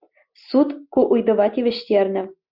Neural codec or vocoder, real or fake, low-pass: none; real; 5.4 kHz